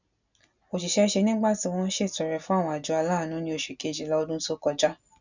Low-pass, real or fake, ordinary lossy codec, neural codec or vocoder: 7.2 kHz; real; none; none